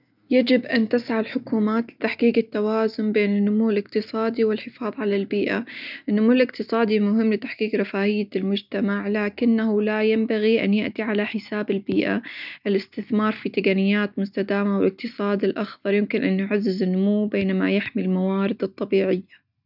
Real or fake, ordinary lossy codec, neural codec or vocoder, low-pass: real; none; none; 5.4 kHz